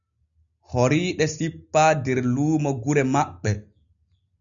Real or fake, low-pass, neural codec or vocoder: real; 7.2 kHz; none